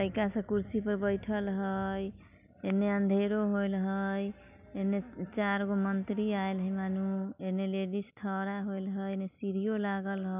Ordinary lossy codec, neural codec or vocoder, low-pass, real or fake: none; none; 3.6 kHz; real